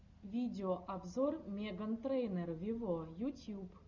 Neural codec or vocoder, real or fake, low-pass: none; real; 7.2 kHz